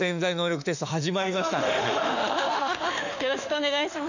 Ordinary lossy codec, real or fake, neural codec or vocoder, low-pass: none; fake; autoencoder, 48 kHz, 32 numbers a frame, DAC-VAE, trained on Japanese speech; 7.2 kHz